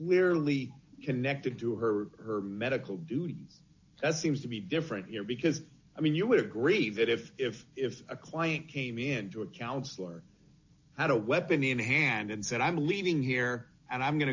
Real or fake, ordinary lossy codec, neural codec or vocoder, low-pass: real; AAC, 48 kbps; none; 7.2 kHz